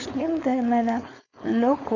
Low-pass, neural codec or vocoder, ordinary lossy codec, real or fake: 7.2 kHz; codec, 16 kHz, 4.8 kbps, FACodec; none; fake